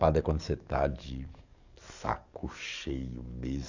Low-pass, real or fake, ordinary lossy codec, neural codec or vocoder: 7.2 kHz; fake; none; codec, 44.1 kHz, 7.8 kbps, DAC